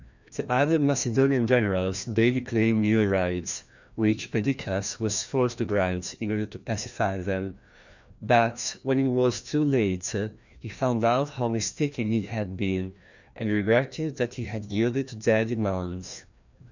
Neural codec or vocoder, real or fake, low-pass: codec, 16 kHz, 1 kbps, FreqCodec, larger model; fake; 7.2 kHz